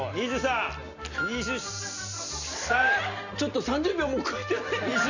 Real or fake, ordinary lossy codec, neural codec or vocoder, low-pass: real; none; none; 7.2 kHz